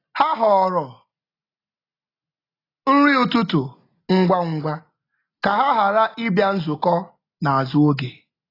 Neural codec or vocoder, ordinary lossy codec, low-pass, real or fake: none; AAC, 24 kbps; 5.4 kHz; real